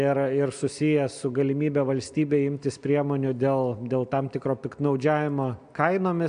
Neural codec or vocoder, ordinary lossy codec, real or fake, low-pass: none; MP3, 96 kbps; real; 9.9 kHz